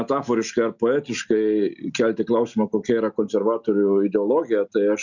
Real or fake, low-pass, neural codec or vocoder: real; 7.2 kHz; none